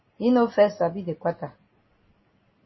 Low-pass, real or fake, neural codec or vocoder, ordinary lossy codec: 7.2 kHz; real; none; MP3, 24 kbps